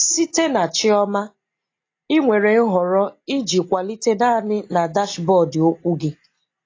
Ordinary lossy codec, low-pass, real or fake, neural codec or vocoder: AAC, 32 kbps; 7.2 kHz; real; none